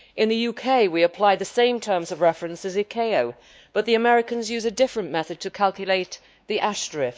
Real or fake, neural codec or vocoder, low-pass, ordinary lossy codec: fake; codec, 16 kHz, 2 kbps, X-Codec, WavLM features, trained on Multilingual LibriSpeech; none; none